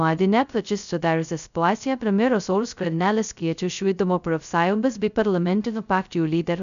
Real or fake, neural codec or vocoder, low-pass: fake; codec, 16 kHz, 0.2 kbps, FocalCodec; 7.2 kHz